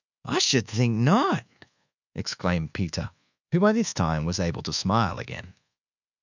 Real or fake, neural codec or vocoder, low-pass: fake; codec, 24 kHz, 1.2 kbps, DualCodec; 7.2 kHz